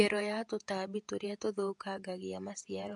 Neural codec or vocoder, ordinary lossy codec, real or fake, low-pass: vocoder, 48 kHz, 128 mel bands, Vocos; MP3, 64 kbps; fake; 10.8 kHz